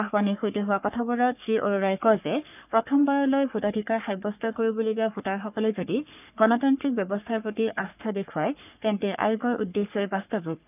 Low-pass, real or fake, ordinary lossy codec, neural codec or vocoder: 3.6 kHz; fake; none; codec, 44.1 kHz, 3.4 kbps, Pupu-Codec